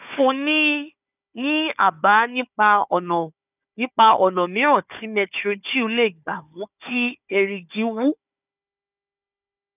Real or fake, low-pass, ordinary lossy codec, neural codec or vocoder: fake; 3.6 kHz; none; codec, 16 kHz, 4 kbps, FunCodec, trained on Chinese and English, 50 frames a second